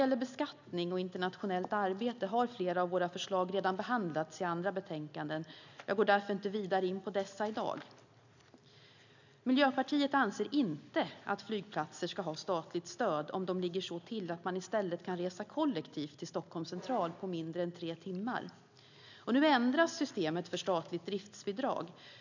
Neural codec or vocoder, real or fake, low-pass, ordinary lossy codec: none; real; 7.2 kHz; none